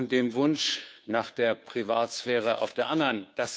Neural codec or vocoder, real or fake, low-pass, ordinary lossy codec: codec, 16 kHz, 2 kbps, FunCodec, trained on Chinese and English, 25 frames a second; fake; none; none